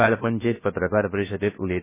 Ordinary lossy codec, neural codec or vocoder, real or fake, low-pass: MP3, 16 kbps; codec, 16 kHz, 0.7 kbps, FocalCodec; fake; 3.6 kHz